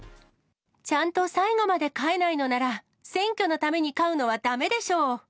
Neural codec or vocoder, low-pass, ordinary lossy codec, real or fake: none; none; none; real